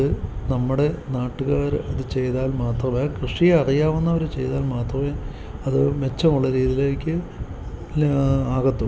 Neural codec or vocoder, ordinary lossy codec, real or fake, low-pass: none; none; real; none